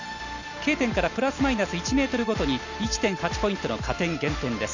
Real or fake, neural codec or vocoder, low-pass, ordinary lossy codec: real; none; 7.2 kHz; none